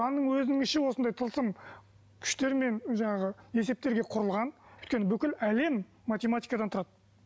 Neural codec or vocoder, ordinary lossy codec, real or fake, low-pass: none; none; real; none